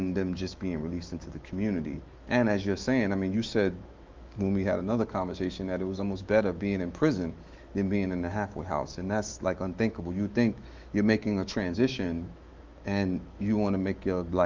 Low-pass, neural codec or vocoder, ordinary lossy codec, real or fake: 7.2 kHz; autoencoder, 48 kHz, 128 numbers a frame, DAC-VAE, trained on Japanese speech; Opus, 32 kbps; fake